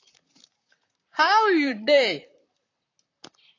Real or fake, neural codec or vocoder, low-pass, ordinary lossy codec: fake; vocoder, 44.1 kHz, 128 mel bands, Pupu-Vocoder; 7.2 kHz; AAC, 32 kbps